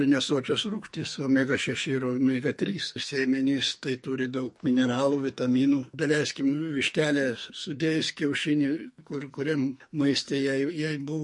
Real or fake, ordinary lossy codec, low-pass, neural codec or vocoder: fake; MP3, 48 kbps; 10.8 kHz; codec, 44.1 kHz, 2.6 kbps, SNAC